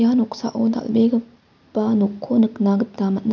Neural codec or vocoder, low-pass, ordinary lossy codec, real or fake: none; 7.2 kHz; none; real